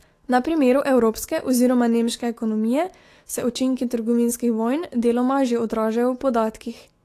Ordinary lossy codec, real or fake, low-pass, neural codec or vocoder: AAC, 48 kbps; fake; 14.4 kHz; autoencoder, 48 kHz, 128 numbers a frame, DAC-VAE, trained on Japanese speech